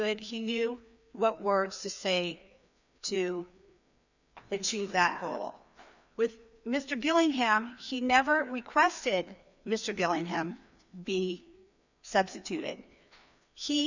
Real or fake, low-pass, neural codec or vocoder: fake; 7.2 kHz; codec, 16 kHz, 2 kbps, FreqCodec, larger model